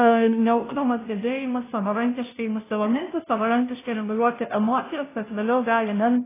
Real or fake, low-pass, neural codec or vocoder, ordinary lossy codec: fake; 3.6 kHz; codec, 16 kHz, 0.5 kbps, FunCodec, trained on Chinese and English, 25 frames a second; AAC, 16 kbps